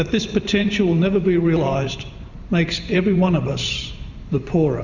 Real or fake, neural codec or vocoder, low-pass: fake; vocoder, 44.1 kHz, 128 mel bands every 512 samples, BigVGAN v2; 7.2 kHz